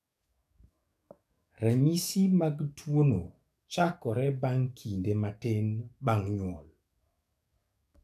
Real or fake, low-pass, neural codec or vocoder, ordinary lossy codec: fake; 14.4 kHz; codec, 44.1 kHz, 7.8 kbps, DAC; none